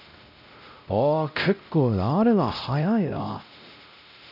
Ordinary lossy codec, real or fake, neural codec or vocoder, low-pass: none; fake; codec, 16 kHz, 0.5 kbps, X-Codec, WavLM features, trained on Multilingual LibriSpeech; 5.4 kHz